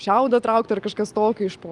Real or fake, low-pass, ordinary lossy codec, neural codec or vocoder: real; 10.8 kHz; Opus, 32 kbps; none